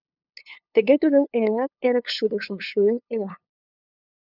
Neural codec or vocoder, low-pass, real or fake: codec, 16 kHz, 2 kbps, FunCodec, trained on LibriTTS, 25 frames a second; 5.4 kHz; fake